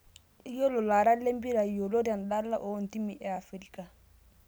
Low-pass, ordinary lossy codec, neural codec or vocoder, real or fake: none; none; none; real